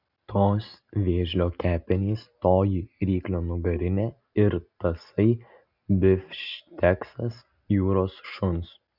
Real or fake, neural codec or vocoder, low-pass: real; none; 5.4 kHz